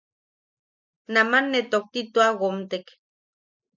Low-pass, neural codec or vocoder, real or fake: 7.2 kHz; none; real